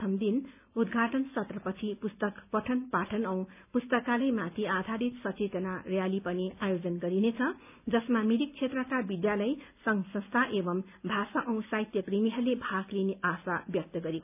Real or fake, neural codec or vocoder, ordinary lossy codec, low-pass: real; none; none; 3.6 kHz